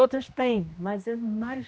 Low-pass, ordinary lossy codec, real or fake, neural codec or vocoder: none; none; fake; codec, 16 kHz, 1 kbps, X-Codec, HuBERT features, trained on general audio